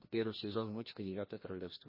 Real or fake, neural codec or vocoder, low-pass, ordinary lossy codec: fake; codec, 16 kHz, 1 kbps, FreqCodec, larger model; 5.4 kHz; MP3, 24 kbps